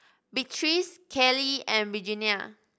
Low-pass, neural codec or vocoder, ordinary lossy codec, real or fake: none; none; none; real